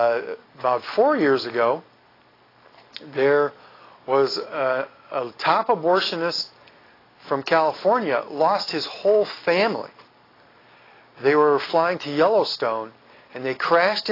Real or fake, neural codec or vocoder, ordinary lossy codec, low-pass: real; none; AAC, 24 kbps; 5.4 kHz